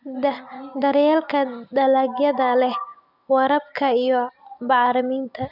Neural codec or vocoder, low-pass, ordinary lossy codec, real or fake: none; 5.4 kHz; none; real